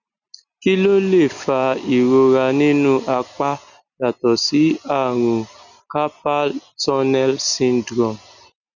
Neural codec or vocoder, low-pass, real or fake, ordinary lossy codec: none; 7.2 kHz; real; none